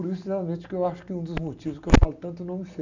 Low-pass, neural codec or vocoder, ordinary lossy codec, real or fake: 7.2 kHz; none; none; real